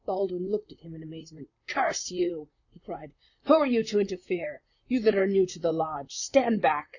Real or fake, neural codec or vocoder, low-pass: fake; vocoder, 44.1 kHz, 128 mel bands, Pupu-Vocoder; 7.2 kHz